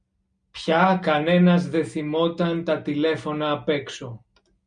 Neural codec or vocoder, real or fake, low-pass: none; real; 9.9 kHz